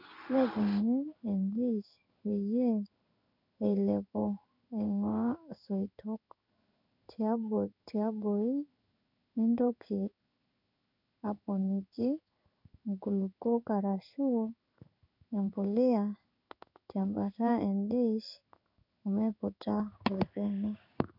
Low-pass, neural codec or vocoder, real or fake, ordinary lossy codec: 5.4 kHz; codec, 16 kHz in and 24 kHz out, 1 kbps, XY-Tokenizer; fake; none